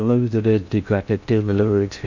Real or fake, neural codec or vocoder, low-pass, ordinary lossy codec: fake; codec, 16 kHz in and 24 kHz out, 0.6 kbps, FocalCodec, streaming, 2048 codes; 7.2 kHz; none